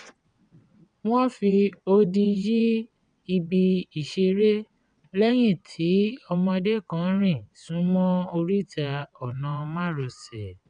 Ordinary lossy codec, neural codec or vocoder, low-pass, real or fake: none; vocoder, 22.05 kHz, 80 mel bands, WaveNeXt; 9.9 kHz; fake